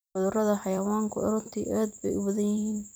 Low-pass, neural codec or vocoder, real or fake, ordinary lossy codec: none; vocoder, 44.1 kHz, 128 mel bands every 256 samples, BigVGAN v2; fake; none